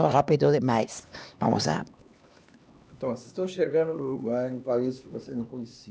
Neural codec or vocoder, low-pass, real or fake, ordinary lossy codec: codec, 16 kHz, 2 kbps, X-Codec, HuBERT features, trained on LibriSpeech; none; fake; none